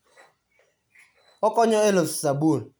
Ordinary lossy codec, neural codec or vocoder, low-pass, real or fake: none; none; none; real